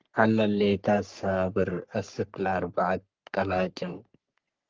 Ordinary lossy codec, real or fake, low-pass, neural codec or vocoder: Opus, 32 kbps; fake; 7.2 kHz; codec, 44.1 kHz, 3.4 kbps, Pupu-Codec